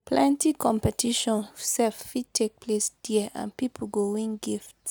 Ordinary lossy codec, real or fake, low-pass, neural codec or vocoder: none; real; none; none